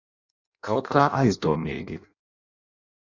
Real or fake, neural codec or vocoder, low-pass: fake; codec, 16 kHz in and 24 kHz out, 0.6 kbps, FireRedTTS-2 codec; 7.2 kHz